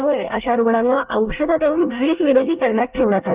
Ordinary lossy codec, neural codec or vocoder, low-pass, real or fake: Opus, 24 kbps; codec, 24 kHz, 1 kbps, SNAC; 3.6 kHz; fake